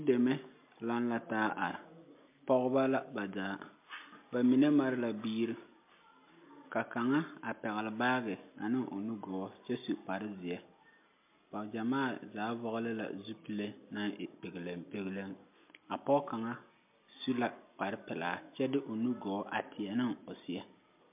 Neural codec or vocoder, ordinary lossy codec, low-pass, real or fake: none; MP3, 32 kbps; 3.6 kHz; real